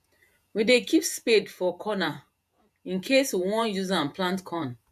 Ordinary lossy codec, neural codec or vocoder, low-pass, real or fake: MP3, 96 kbps; vocoder, 48 kHz, 128 mel bands, Vocos; 14.4 kHz; fake